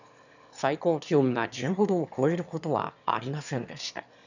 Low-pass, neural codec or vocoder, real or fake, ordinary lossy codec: 7.2 kHz; autoencoder, 22.05 kHz, a latent of 192 numbers a frame, VITS, trained on one speaker; fake; none